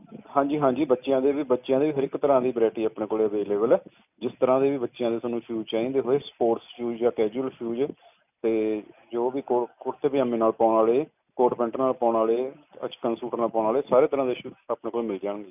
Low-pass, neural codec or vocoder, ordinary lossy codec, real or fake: 3.6 kHz; none; none; real